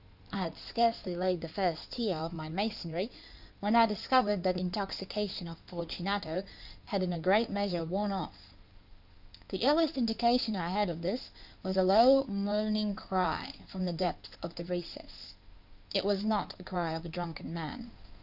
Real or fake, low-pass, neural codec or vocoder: fake; 5.4 kHz; codec, 16 kHz in and 24 kHz out, 2.2 kbps, FireRedTTS-2 codec